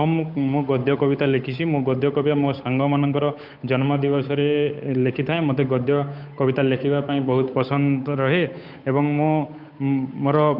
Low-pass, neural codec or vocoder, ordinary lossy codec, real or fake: 5.4 kHz; codec, 16 kHz, 8 kbps, FunCodec, trained on Chinese and English, 25 frames a second; none; fake